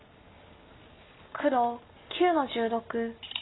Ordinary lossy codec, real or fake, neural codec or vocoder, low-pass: AAC, 16 kbps; real; none; 7.2 kHz